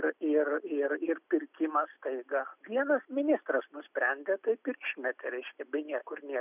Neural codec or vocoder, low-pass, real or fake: none; 3.6 kHz; real